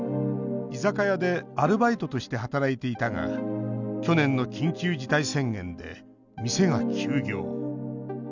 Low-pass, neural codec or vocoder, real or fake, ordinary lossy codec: 7.2 kHz; none; real; none